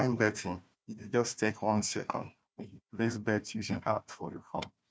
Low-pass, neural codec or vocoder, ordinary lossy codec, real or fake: none; codec, 16 kHz, 1 kbps, FunCodec, trained on Chinese and English, 50 frames a second; none; fake